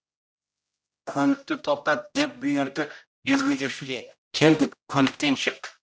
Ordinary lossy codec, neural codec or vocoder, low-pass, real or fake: none; codec, 16 kHz, 0.5 kbps, X-Codec, HuBERT features, trained on general audio; none; fake